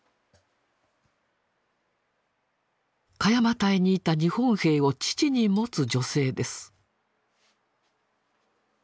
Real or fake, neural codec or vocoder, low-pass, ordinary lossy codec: real; none; none; none